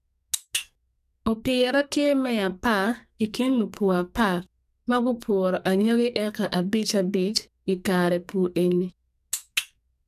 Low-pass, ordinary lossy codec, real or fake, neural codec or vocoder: 14.4 kHz; none; fake; codec, 44.1 kHz, 2.6 kbps, SNAC